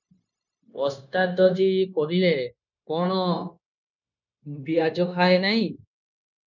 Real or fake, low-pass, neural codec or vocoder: fake; 7.2 kHz; codec, 16 kHz, 0.9 kbps, LongCat-Audio-Codec